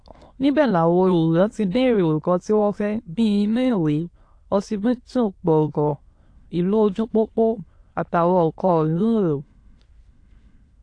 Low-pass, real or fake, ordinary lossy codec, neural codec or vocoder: 9.9 kHz; fake; AAC, 48 kbps; autoencoder, 22.05 kHz, a latent of 192 numbers a frame, VITS, trained on many speakers